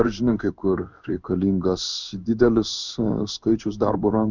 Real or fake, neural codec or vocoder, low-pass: fake; codec, 16 kHz in and 24 kHz out, 1 kbps, XY-Tokenizer; 7.2 kHz